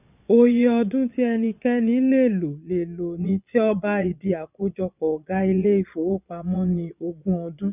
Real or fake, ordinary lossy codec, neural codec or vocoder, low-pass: fake; none; vocoder, 22.05 kHz, 80 mel bands, Vocos; 3.6 kHz